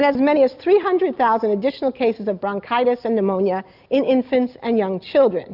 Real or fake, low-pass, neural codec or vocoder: real; 5.4 kHz; none